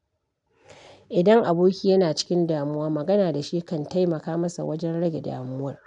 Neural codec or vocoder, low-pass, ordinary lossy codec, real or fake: none; 10.8 kHz; none; real